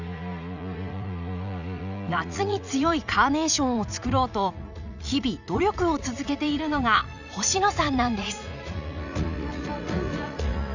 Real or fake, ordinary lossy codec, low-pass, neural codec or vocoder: fake; none; 7.2 kHz; vocoder, 44.1 kHz, 80 mel bands, Vocos